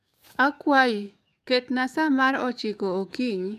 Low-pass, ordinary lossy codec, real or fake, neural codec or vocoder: 14.4 kHz; none; fake; codec, 44.1 kHz, 7.8 kbps, DAC